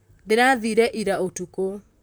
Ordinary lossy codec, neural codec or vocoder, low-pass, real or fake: none; vocoder, 44.1 kHz, 128 mel bands, Pupu-Vocoder; none; fake